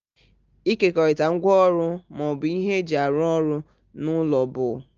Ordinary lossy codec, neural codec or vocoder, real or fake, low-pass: Opus, 24 kbps; none; real; 7.2 kHz